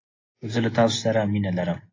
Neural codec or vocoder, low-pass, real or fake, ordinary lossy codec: none; 7.2 kHz; real; AAC, 32 kbps